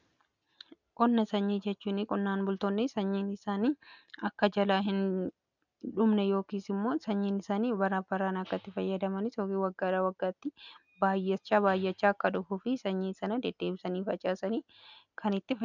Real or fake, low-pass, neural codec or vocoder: real; 7.2 kHz; none